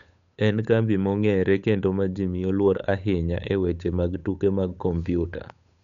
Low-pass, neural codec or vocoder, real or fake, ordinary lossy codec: 7.2 kHz; codec, 16 kHz, 8 kbps, FunCodec, trained on Chinese and English, 25 frames a second; fake; none